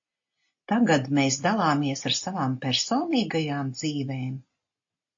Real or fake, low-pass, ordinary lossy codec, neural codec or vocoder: real; 7.2 kHz; AAC, 48 kbps; none